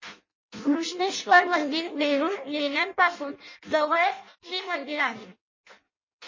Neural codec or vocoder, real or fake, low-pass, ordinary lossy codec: codec, 16 kHz in and 24 kHz out, 0.6 kbps, FireRedTTS-2 codec; fake; 7.2 kHz; MP3, 32 kbps